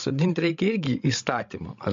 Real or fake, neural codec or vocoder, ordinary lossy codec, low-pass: fake; codec, 16 kHz, 16 kbps, FreqCodec, larger model; MP3, 48 kbps; 7.2 kHz